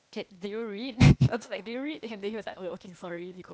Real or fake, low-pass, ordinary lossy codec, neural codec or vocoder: fake; none; none; codec, 16 kHz, 0.8 kbps, ZipCodec